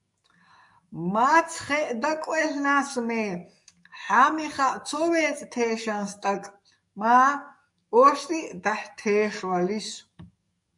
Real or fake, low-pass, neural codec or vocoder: fake; 10.8 kHz; codec, 44.1 kHz, 7.8 kbps, DAC